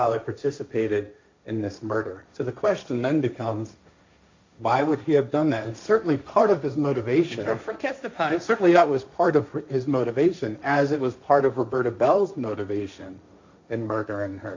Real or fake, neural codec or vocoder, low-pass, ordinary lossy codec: fake; codec, 16 kHz, 1.1 kbps, Voila-Tokenizer; 7.2 kHz; MP3, 64 kbps